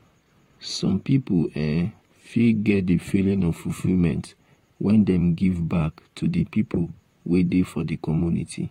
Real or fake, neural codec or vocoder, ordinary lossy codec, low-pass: fake; vocoder, 44.1 kHz, 128 mel bands, Pupu-Vocoder; AAC, 48 kbps; 19.8 kHz